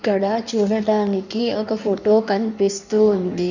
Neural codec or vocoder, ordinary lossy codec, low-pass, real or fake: codec, 16 kHz in and 24 kHz out, 1.1 kbps, FireRedTTS-2 codec; none; 7.2 kHz; fake